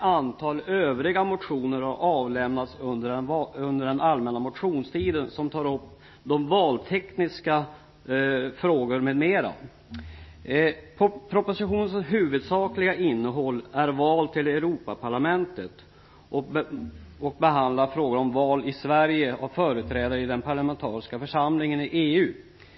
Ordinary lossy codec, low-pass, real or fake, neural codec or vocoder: MP3, 24 kbps; 7.2 kHz; real; none